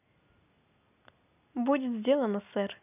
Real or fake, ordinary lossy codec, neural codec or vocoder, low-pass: real; none; none; 3.6 kHz